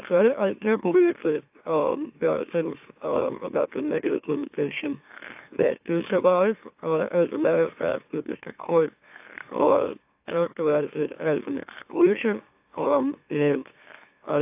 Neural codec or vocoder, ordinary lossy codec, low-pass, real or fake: autoencoder, 44.1 kHz, a latent of 192 numbers a frame, MeloTTS; AAC, 32 kbps; 3.6 kHz; fake